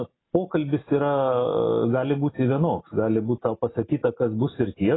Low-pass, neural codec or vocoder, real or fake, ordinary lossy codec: 7.2 kHz; none; real; AAC, 16 kbps